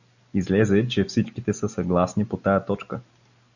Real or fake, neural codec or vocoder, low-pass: real; none; 7.2 kHz